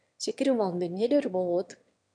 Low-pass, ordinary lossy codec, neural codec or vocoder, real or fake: 9.9 kHz; AAC, 64 kbps; autoencoder, 22.05 kHz, a latent of 192 numbers a frame, VITS, trained on one speaker; fake